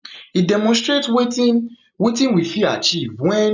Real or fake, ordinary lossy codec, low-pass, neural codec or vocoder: real; none; 7.2 kHz; none